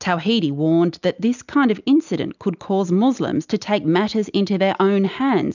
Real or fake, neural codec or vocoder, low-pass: real; none; 7.2 kHz